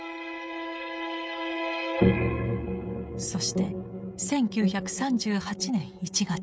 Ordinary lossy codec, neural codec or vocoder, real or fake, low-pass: none; codec, 16 kHz, 16 kbps, FreqCodec, smaller model; fake; none